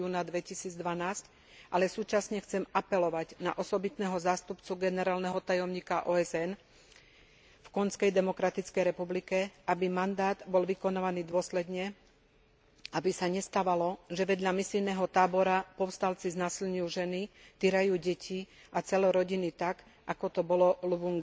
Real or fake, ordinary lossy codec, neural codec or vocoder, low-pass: real; none; none; none